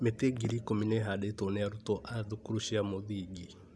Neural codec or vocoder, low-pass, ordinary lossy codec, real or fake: none; none; none; real